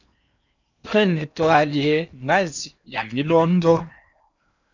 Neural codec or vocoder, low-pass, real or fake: codec, 16 kHz in and 24 kHz out, 0.8 kbps, FocalCodec, streaming, 65536 codes; 7.2 kHz; fake